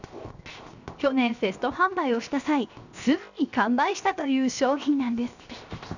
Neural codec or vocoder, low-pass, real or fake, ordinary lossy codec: codec, 16 kHz, 0.7 kbps, FocalCodec; 7.2 kHz; fake; none